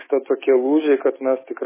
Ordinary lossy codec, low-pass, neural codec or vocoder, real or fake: MP3, 16 kbps; 3.6 kHz; autoencoder, 48 kHz, 128 numbers a frame, DAC-VAE, trained on Japanese speech; fake